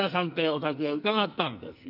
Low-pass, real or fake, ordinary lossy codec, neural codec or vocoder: 5.4 kHz; fake; none; codec, 44.1 kHz, 2.6 kbps, SNAC